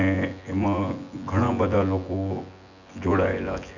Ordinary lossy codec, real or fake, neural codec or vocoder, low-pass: none; fake; vocoder, 24 kHz, 100 mel bands, Vocos; 7.2 kHz